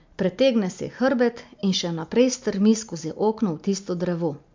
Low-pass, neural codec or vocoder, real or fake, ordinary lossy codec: 7.2 kHz; none; real; MP3, 64 kbps